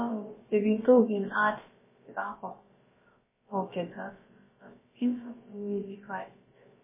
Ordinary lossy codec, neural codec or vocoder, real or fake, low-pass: MP3, 16 kbps; codec, 16 kHz, about 1 kbps, DyCAST, with the encoder's durations; fake; 3.6 kHz